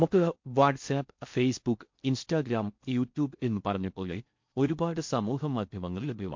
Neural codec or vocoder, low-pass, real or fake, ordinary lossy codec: codec, 16 kHz in and 24 kHz out, 0.6 kbps, FocalCodec, streaming, 4096 codes; 7.2 kHz; fake; MP3, 48 kbps